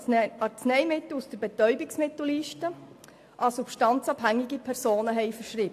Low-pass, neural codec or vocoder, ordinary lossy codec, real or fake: 14.4 kHz; none; AAC, 64 kbps; real